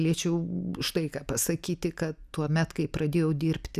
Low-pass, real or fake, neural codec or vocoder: 14.4 kHz; real; none